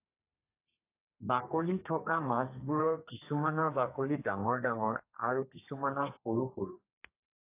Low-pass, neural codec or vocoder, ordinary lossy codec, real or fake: 3.6 kHz; codec, 32 kHz, 1.9 kbps, SNAC; AAC, 24 kbps; fake